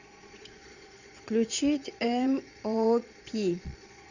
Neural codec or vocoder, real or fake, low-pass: vocoder, 22.05 kHz, 80 mel bands, Vocos; fake; 7.2 kHz